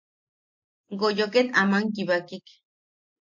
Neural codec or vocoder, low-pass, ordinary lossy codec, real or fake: none; 7.2 kHz; MP3, 48 kbps; real